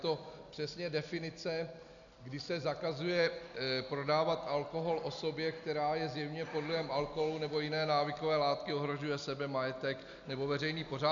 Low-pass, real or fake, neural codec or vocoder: 7.2 kHz; real; none